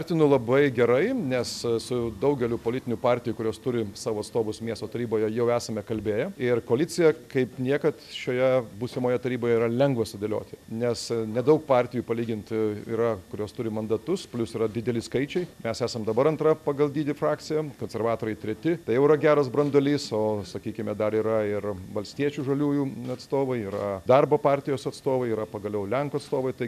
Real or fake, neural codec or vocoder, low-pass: real; none; 14.4 kHz